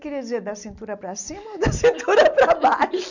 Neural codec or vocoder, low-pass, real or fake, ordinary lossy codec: none; 7.2 kHz; real; none